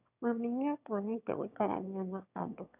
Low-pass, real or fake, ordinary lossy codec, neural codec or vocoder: 3.6 kHz; fake; none; autoencoder, 22.05 kHz, a latent of 192 numbers a frame, VITS, trained on one speaker